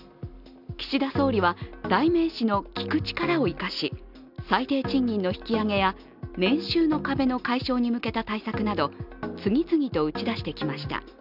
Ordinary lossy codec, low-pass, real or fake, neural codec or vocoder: none; 5.4 kHz; real; none